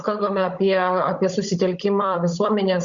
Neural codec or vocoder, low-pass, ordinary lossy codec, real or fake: codec, 16 kHz, 16 kbps, FunCodec, trained on Chinese and English, 50 frames a second; 7.2 kHz; Opus, 64 kbps; fake